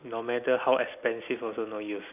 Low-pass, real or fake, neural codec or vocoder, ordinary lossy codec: 3.6 kHz; real; none; none